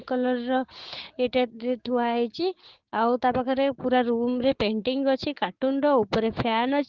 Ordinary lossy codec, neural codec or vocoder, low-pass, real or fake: Opus, 16 kbps; codec, 16 kHz, 6 kbps, DAC; 7.2 kHz; fake